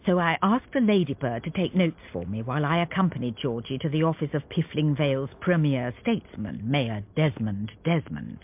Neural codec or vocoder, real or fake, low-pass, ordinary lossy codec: none; real; 3.6 kHz; MP3, 32 kbps